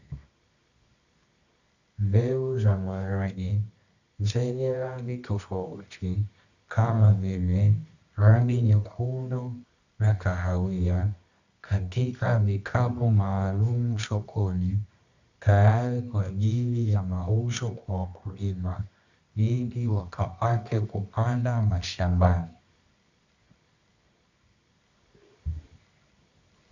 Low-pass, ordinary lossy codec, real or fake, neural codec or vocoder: 7.2 kHz; Opus, 64 kbps; fake; codec, 24 kHz, 0.9 kbps, WavTokenizer, medium music audio release